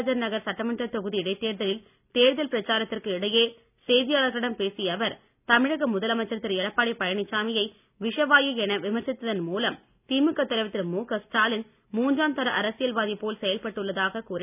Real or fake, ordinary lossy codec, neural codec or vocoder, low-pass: real; none; none; 3.6 kHz